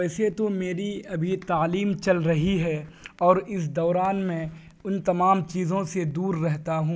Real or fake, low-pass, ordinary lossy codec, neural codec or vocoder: real; none; none; none